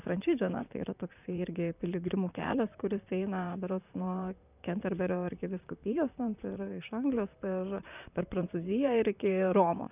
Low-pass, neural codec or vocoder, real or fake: 3.6 kHz; vocoder, 44.1 kHz, 128 mel bands, Pupu-Vocoder; fake